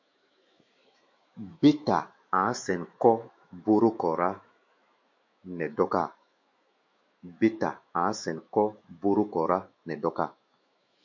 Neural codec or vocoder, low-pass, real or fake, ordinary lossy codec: autoencoder, 48 kHz, 128 numbers a frame, DAC-VAE, trained on Japanese speech; 7.2 kHz; fake; MP3, 48 kbps